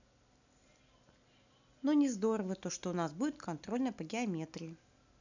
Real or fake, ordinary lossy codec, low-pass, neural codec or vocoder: real; none; 7.2 kHz; none